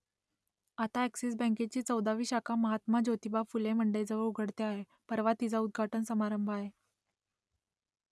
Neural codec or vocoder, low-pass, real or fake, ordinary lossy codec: none; none; real; none